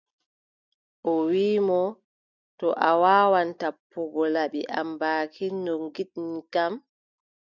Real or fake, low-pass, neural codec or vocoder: real; 7.2 kHz; none